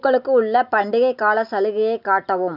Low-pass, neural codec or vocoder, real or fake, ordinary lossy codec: 5.4 kHz; none; real; none